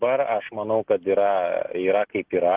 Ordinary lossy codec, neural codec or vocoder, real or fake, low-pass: Opus, 16 kbps; none; real; 3.6 kHz